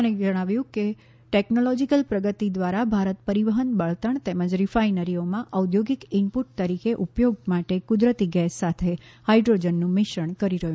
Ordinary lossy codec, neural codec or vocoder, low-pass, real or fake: none; none; none; real